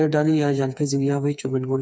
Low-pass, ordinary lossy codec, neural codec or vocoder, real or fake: none; none; codec, 16 kHz, 4 kbps, FreqCodec, smaller model; fake